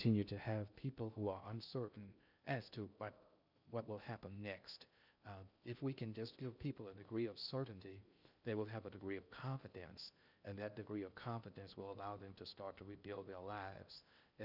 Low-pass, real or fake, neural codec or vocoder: 5.4 kHz; fake; codec, 16 kHz in and 24 kHz out, 0.6 kbps, FocalCodec, streaming, 2048 codes